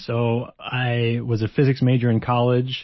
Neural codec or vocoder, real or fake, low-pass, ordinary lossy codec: none; real; 7.2 kHz; MP3, 24 kbps